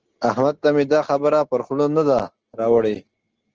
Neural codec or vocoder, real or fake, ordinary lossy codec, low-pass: none; real; Opus, 16 kbps; 7.2 kHz